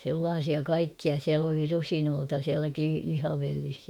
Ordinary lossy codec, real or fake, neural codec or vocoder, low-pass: none; fake; autoencoder, 48 kHz, 32 numbers a frame, DAC-VAE, trained on Japanese speech; 19.8 kHz